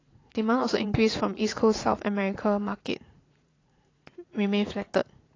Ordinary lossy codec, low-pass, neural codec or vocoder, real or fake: AAC, 32 kbps; 7.2 kHz; vocoder, 44.1 kHz, 80 mel bands, Vocos; fake